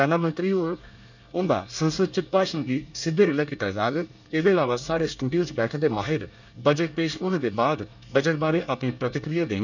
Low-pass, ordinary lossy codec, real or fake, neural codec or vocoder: 7.2 kHz; none; fake; codec, 24 kHz, 1 kbps, SNAC